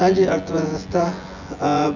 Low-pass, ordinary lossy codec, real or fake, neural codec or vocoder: 7.2 kHz; none; fake; vocoder, 24 kHz, 100 mel bands, Vocos